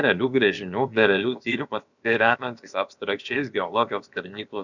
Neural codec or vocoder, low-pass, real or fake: codec, 16 kHz, about 1 kbps, DyCAST, with the encoder's durations; 7.2 kHz; fake